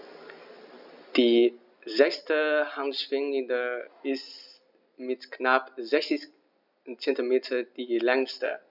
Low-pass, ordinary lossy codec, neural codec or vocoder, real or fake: 5.4 kHz; none; none; real